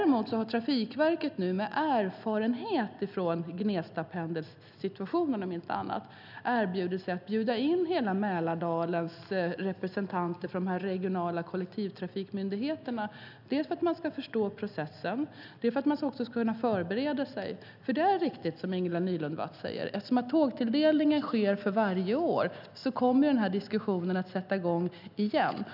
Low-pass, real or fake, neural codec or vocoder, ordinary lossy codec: 5.4 kHz; real; none; none